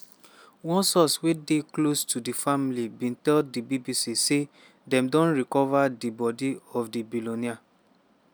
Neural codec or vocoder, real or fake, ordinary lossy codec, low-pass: none; real; none; none